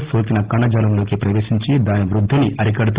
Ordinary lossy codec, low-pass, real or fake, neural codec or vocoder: Opus, 16 kbps; 3.6 kHz; real; none